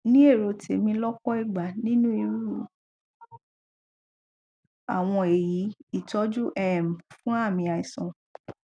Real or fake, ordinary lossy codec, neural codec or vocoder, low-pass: real; none; none; 9.9 kHz